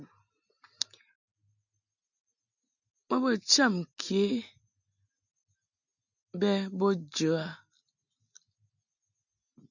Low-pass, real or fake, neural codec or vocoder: 7.2 kHz; real; none